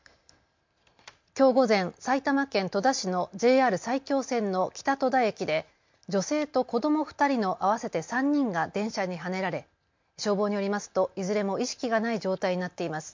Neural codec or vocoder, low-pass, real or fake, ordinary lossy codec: none; 7.2 kHz; real; MP3, 48 kbps